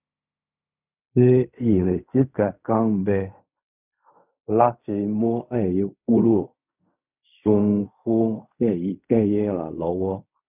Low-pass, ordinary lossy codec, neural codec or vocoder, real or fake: 3.6 kHz; none; codec, 16 kHz in and 24 kHz out, 0.4 kbps, LongCat-Audio-Codec, fine tuned four codebook decoder; fake